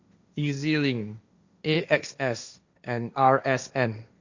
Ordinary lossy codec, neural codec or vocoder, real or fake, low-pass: Opus, 64 kbps; codec, 16 kHz, 1.1 kbps, Voila-Tokenizer; fake; 7.2 kHz